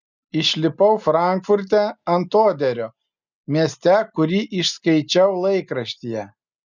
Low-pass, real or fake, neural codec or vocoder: 7.2 kHz; real; none